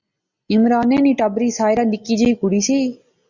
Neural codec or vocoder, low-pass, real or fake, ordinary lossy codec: none; 7.2 kHz; real; Opus, 64 kbps